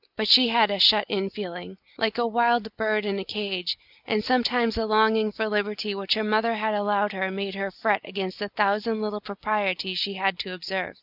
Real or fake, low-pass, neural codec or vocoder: real; 5.4 kHz; none